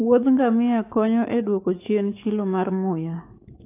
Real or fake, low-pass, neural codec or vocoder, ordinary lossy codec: fake; 3.6 kHz; codec, 44.1 kHz, 7.8 kbps, DAC; AAC, 24 kbps